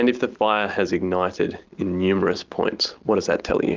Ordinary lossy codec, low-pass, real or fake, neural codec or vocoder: Opus, 24 kbps; 7.2 kHz; real; none